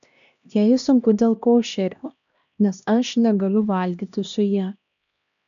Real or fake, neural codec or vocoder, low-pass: fake; codec, 16 kHz, 1 kbps, X-Codec, HuBERT features, trained on LibriSpeech; 7.2 kHz